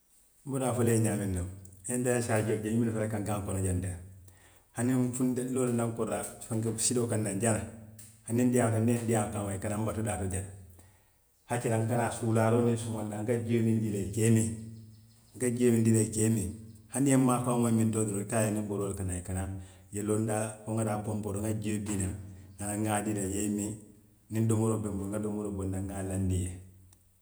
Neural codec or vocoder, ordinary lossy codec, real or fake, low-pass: vocoder, 48 kHz, 128 mel bands, Vocos; none; fake; none